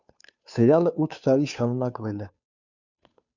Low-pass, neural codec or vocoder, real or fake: 7.2 kHz; codec, 16 kHz, 2 kbps, FunCodec, trained on Chinese and English, 25 frames a second; fake